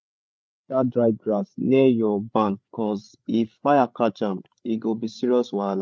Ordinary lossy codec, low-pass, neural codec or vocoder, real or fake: none; none; codec, 16 kHz, 8 kbps, FreqCodec, larger model; fake